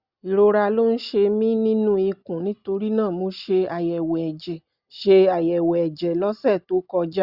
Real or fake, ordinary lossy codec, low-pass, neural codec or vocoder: real; Opus, 64 kbps; 5.4 kHz; none